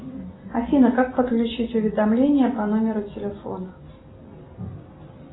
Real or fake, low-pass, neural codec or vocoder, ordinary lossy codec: real; 7.2 kHz; none; AAC, 16 kbps